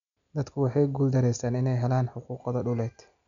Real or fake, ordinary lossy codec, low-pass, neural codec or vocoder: real; none; 7.2 kHz; none